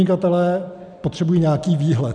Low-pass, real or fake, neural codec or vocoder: 9.9 kHz; real; none